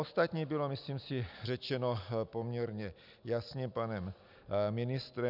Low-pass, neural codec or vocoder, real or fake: 5.4 kHz; none; real